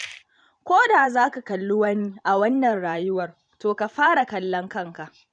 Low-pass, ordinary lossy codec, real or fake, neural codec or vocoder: 9.9 kHz; none; fake; vocoder, 44.1 kHz, 128 mel bands every 512 samples, BigVGAN v2